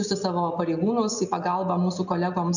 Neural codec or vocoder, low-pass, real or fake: none; 7.2 kHz; real